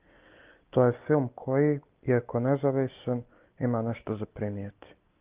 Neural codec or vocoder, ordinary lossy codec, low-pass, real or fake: none; Opus, 32 kbps; 3.6 kHz; real